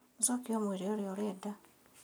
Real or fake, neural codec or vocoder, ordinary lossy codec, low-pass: real; none; none; none